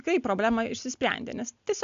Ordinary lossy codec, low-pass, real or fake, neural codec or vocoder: AAC, 96 kbps; 7.2 kHz; real; none